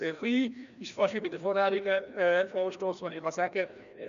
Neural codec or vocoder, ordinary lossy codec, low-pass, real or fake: codec, 16 kHz, 1 kbps, FreqCodec, larger model; none; 7.2 kHz; fake